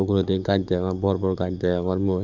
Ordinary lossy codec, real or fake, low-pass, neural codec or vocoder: none; fake; 7.2 kHz; codec, 44.1 kHz, 7.8 kbps, DAC